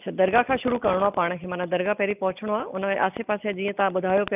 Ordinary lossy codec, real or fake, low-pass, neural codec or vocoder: none; real; 3.6 kHz; none